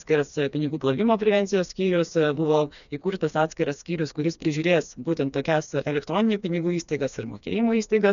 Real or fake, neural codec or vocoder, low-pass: fake; codec, 16 kHz, 2 kbps, FreqCodec, smaller model; 7.2 kHz